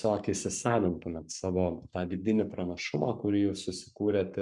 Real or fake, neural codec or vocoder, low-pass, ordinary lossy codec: fake; codec, 44.1 kHz, 7.8 kbps, Pupu-Codec; 10.8 kHz; MP3, 96 kbps